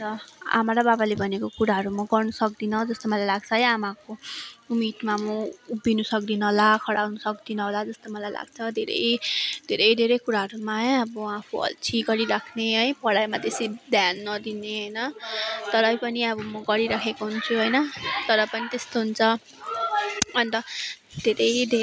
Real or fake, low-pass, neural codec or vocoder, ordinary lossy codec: real; none; none; none